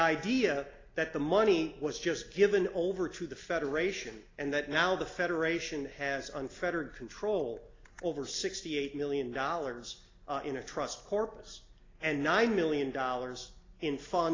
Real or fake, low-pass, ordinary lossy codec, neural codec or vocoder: real; 7.2 kHz; AAC, 32 kbps; none